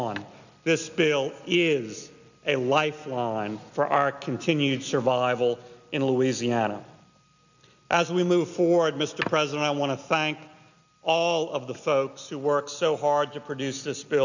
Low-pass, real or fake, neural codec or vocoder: 7.2 kHz; real; none